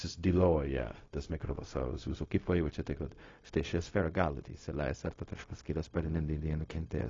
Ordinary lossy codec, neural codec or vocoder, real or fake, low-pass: AAC, 32 kbps; codec, 16 kHz, 0.4 kbps, LongCat-Audio-Codec; fake; 7.2 kHz